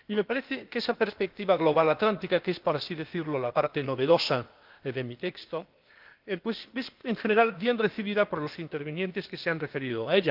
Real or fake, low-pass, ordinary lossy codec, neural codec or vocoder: fake; 5.4 kHz; Opus, 32 kbps; codec, 16 kHz, 0.8 kbps, ZipCodec